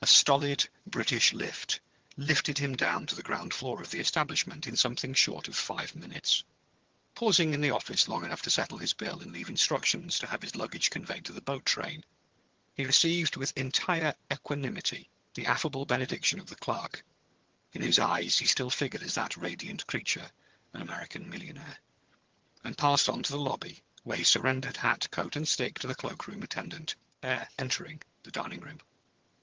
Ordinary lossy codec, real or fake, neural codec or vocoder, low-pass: Opus, 16 kbps; fake; vocoder, 22.05 kHz, 80 mel bands, HiFi-GAN; 7.2 kHz